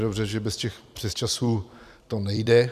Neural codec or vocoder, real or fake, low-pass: vocoder, 44.1 kHz, 128 mel bands every 256 samples, BigVGAN v2; fake; 14.4 kHz